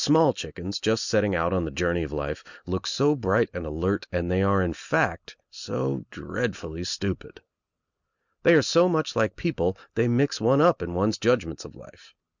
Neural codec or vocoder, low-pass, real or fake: none; 7.2 kHz; real